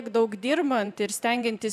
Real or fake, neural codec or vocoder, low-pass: fake; vocoder, 44.1 kHz, 128 mel bands every 512 samples, BigVGAN v2; 14.4 kHz